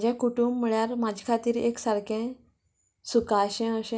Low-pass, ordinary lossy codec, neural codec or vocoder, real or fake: none; none; none; real